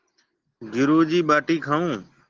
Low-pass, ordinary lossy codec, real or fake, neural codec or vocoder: 7.2 kHz; Opus, 16 kbps; real; none